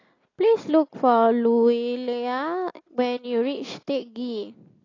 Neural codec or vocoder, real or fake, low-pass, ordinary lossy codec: none; real; 7.2 kHz; AAC, 32 kbps